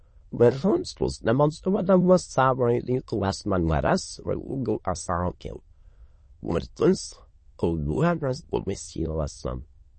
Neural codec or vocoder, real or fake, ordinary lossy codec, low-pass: autoencoder, 22.05 kHz, a latent of 192 numbers a frame, VITS, trained on many speakers; fake; MP3, 32 kbps; 9.9 kHz